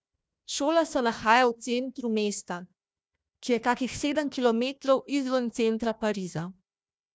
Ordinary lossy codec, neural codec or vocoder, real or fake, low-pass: none; codec, 16 kHz, 1 kbps, FunCodec, trained on Chinese and English, 50 frames a second; fake; none